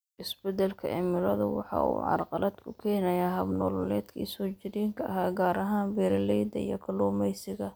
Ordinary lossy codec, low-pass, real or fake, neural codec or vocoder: none; none; real; none